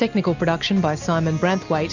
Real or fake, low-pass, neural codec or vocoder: real; 7.2 kHz; none